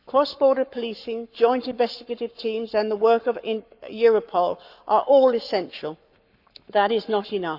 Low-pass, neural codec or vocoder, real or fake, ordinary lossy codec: 5.4 kHz; codec, 44.1 kHz, 7.8 kbps, Pupu-Codec; fake; none